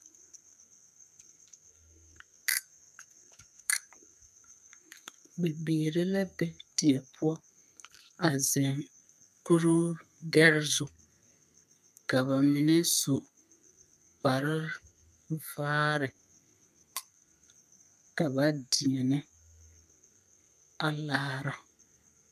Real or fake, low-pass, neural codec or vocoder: fake; 14.4 kHz; codec, 32 kHz, 1.9 kbps, SNAC